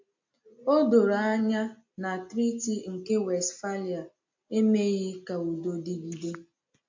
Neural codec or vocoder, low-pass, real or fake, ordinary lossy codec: none; 7.2 kHz; real; MP3, 48 kbps